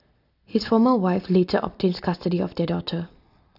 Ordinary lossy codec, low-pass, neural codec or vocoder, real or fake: none; 5.4 kHz; none; real